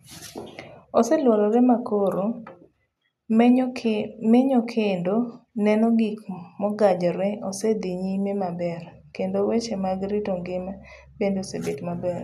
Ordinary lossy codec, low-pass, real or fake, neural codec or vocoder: none; 14.4 kHz; real; none